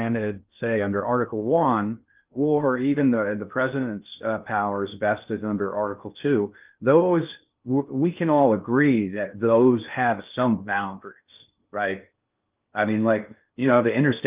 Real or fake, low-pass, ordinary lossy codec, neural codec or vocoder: fake; 3.6 kHz; Opus, 32 kbps; codec, 16 kHz in and 24 kHz out, 0.6 kbps, FocalCodec, streaming, 2048 codes